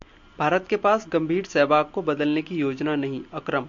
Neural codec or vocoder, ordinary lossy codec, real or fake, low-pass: none; MP3, 48 kbps; real; 7.2 kHz